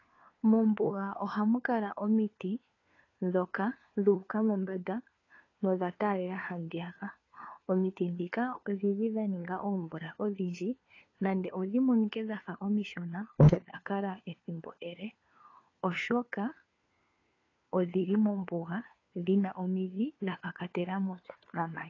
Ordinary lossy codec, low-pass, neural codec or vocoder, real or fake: AAC, 32 kbps; 7.2 kHz; codec, 16 kHz, 4 kbps, FunCodec, trained on LibriTTS, 50 frames a second; fake